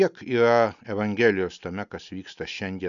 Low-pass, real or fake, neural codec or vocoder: 7.2 kHz; real; none